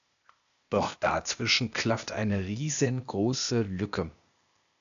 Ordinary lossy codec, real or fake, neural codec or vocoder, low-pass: AAC, 96 kbps; fake; codec, 16 kHz, 0.8 kbps, ZipCodec; 7.2 kHz